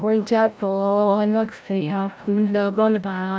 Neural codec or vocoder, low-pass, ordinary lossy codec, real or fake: codec, 16 kHz, 0.5 kbps, FreqCodec, larger model; none; none; fake